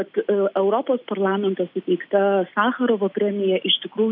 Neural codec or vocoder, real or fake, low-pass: none; real; 5.4 kHz